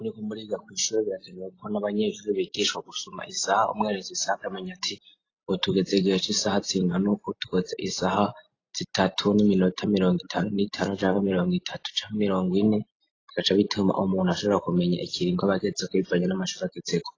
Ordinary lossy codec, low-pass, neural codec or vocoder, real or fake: AAC, 32 kbps; 7.2 kHz; none; real